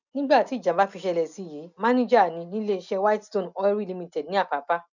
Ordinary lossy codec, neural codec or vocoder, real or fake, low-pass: none; none; real; 7.2 kHz